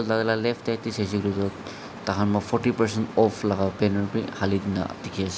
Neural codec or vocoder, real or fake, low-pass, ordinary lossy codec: none; real; none; none